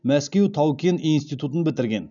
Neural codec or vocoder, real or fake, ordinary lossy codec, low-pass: none; real; none; 7.2 kHz